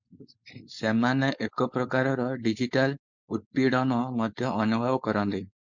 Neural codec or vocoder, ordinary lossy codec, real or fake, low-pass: codec, 16 kHz, 4.8 kbps, FACodec; MP3, 64 kbps; fake; 7.2 kHz